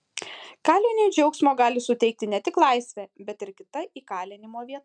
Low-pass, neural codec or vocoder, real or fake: 9.9 kHz; none; real